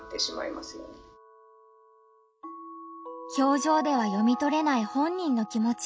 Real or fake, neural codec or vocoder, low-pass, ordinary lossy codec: real; none; none; none